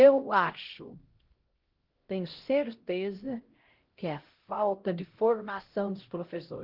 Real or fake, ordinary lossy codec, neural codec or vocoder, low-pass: fake; Opus, 16 kbps; codec, 16 kHz, 0.5 kbps, X-Codec, HuBERT features, trained on LibriSpeech; 5.4 kHz